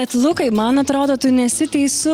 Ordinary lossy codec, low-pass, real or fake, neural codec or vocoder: Opus, 24 kbps; 19.8 kHz; real; none